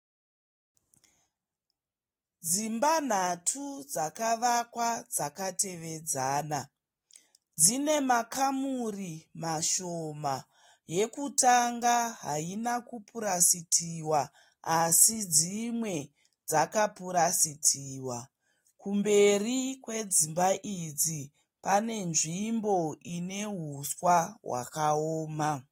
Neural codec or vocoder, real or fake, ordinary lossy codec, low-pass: none; real; AAC, 48 kbps; 19.8 kHz